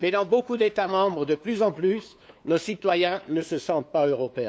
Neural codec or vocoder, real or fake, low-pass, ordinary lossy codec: codec, 16 kHz, 2 kbps, FunCodec, trained on LibriTTS, 25 frames a second; fake; none; none